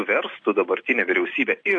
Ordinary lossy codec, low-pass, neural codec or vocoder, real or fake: AAC, 48 kbps; 9.9 kHz; none; real